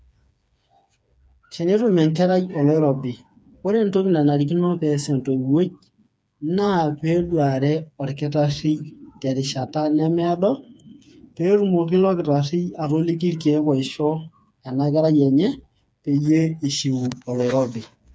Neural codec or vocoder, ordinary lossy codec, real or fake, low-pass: codec, 16 kHz, 4 kbps, FreqCodec, smaller model; none; fake; none